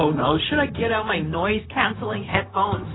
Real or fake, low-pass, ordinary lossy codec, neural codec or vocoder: fake; 7.2 kHz; AAC, 16 kbps; codec, 16 kHz, 0.4 kbps, LongCat-Audio-Codec